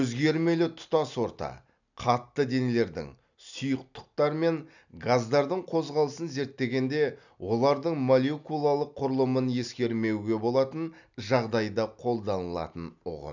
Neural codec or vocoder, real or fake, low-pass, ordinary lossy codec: none; real; 7.2 kHz; none